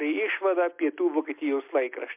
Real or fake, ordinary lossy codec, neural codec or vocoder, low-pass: real; MP3, 32 kbps; none; 3.6 kHz